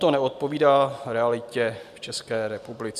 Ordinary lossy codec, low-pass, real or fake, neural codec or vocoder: AAC, 96 kbps; 14.4 kHz; real; none